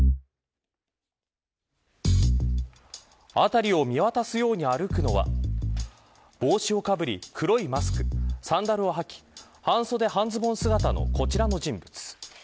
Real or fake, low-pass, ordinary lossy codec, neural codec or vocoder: real; none; none; none